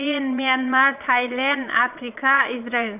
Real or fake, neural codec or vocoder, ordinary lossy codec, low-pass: fake; vocoder, 22.05 kHz, 80 mel bands, Vocos; none; 3.6 kHz